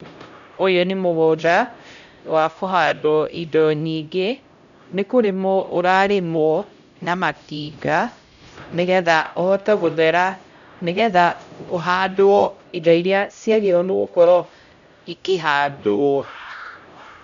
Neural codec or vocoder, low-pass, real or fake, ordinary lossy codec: codec, 16 kHz, 0.5 kbps, X-Codec, HuBERT features, trained on LibriSpeech; 7.2 kHz; fake; none